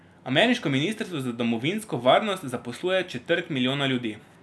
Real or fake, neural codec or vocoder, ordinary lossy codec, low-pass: real; none; none; none